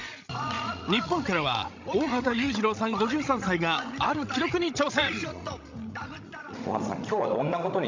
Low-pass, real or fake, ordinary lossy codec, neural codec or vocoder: 7.2 kHz; fake; MP3, 64 kbps; codec, 16 kHz, 16 kbps, FreqCodec, larger model